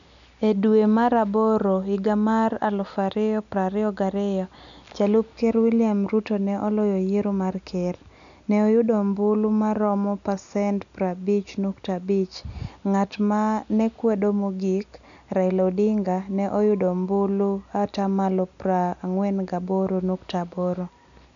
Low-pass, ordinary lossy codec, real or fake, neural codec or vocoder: 7.2 kHz; none; real; none